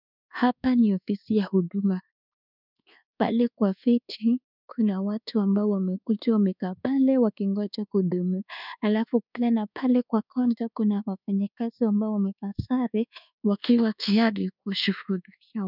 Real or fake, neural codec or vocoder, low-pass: fake; codec, 24 kHz, 1.2 kbps, DualCodec; 5.4 kHz